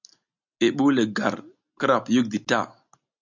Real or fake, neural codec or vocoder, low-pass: real; none; 7.2 kHz